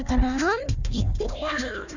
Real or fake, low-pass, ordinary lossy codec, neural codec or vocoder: fake; 7.2 kHz; none; codec, 16 kHz, 1 kbps, FunCodec, trained on Chinese and English, 50 frames a second